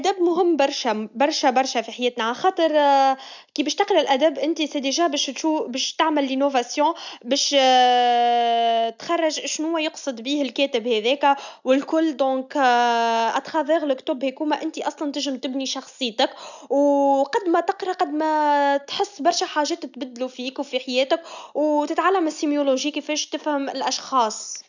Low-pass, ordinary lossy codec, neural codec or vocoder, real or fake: 7.2 kHz; none; none; real